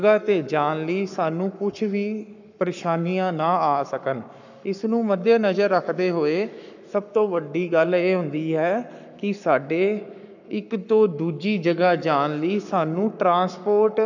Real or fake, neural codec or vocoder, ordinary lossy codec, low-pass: fake; codec, 44.1 kHz, 7.8 kbps, Pupu-Codec; none; 7.2 kHz